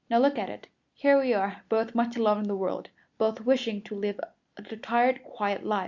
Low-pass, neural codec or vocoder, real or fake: 7.2 kHz; none; real